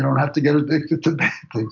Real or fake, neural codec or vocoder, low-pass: real; none; 7.2 kHz